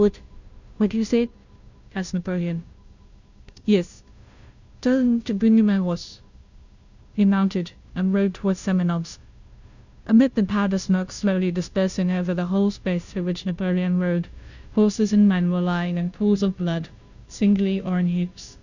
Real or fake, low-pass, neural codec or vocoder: fake; 7.2 kHz; codec, 16 kHz, 0.5 kbps, FunCodec, trained on Chinese and English, 25 frames a second